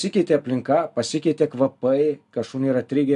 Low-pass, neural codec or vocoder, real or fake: 10.8 kHz; none; real